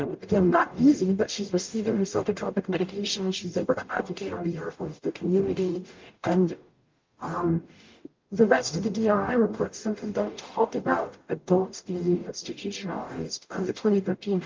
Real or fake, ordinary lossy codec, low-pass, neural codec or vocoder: fake; Opus, 24 kbps; 7.2 kHz; codec, 44.1 kHz, 0.9 kbps, DAC